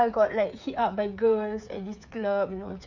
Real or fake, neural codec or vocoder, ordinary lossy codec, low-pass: fake; codec, 16 kHz, 8 kbps, FreqCodec, smaller model; none; 7.2 kHz